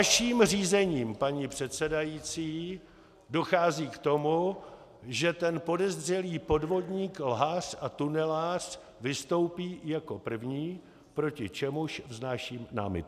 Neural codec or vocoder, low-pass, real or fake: none; 14.4 kHz; real